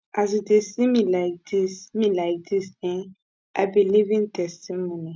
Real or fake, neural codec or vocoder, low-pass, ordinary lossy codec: real; none; none; none